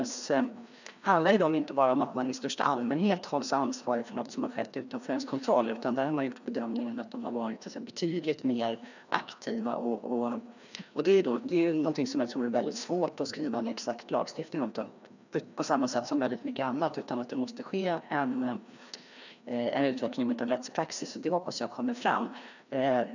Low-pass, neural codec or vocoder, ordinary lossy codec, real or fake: 7.2 kHz; codec, 16 kHz, 1 kbps, FreqCodec, larger model; none; fake